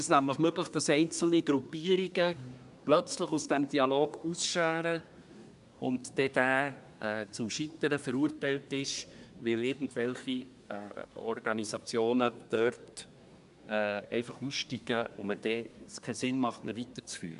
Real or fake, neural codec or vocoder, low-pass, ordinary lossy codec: fake; codec, 24 kHz, 1 kbps, SNAC; 10.8 kHz; none